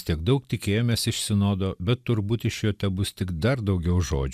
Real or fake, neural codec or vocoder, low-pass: real; none; 14.4 kHz